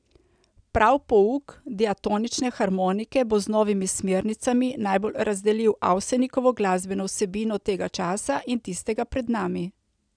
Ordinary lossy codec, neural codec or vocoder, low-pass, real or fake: none; none; 9.9 kHz; real